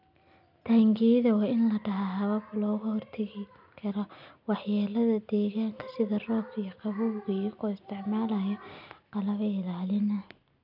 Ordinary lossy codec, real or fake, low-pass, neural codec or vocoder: none; real; 5.4 kHz; none